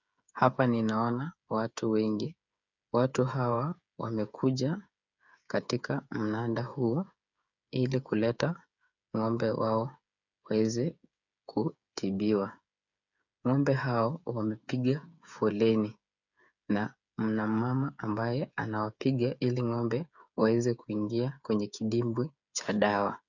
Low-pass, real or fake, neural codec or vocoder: 7.2 kHz; fake; codec, 16 kHz, 8 kbps, FreqCodec, smaller model